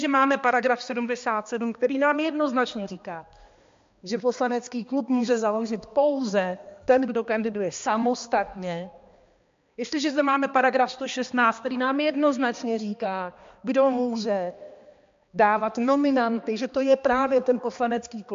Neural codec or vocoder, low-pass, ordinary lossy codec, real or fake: codec, 16 kHz, 2 kbps, X-Codec, HuBERT features, trained on balanced general audio; 7.2 kHz; MP3, 48 kbps; fake